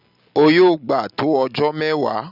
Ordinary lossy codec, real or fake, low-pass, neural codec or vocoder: none; real; 5.4 kHz; none